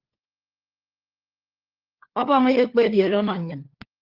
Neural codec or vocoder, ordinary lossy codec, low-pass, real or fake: codec, 16 kHz, 4 kbps, FunCodec, trained on LibriTTS, 50 frames a second; Opus, 16 kbps; 5.4 kHz; fake